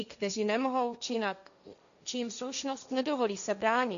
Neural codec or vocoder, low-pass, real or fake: codec, 16 kHz, 1.1 kbps, Voila-Tokenizer; 7.2 kHz; fake